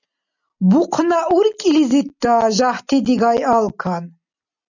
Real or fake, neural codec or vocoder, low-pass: real; none; 7.2 kHz